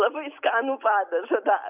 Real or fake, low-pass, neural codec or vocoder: real; 3.6 kHz; none